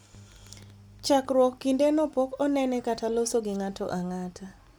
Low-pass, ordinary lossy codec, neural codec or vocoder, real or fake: none; none; none; real